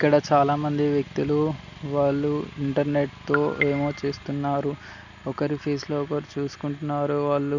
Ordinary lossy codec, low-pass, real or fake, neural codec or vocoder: none; 7.2 kHz; real; none